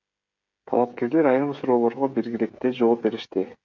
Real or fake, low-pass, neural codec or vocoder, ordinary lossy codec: fake; 7.2 kHz; codec, 16 kHz, 16 kbps, FreqCodec, smaller model; MP3, 64 kbps